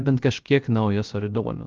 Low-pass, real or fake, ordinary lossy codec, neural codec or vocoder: 7.2 kHz; fake; Opus, 32 kbps; codec, 16 kHz, 0.3 kbps, FocalCodec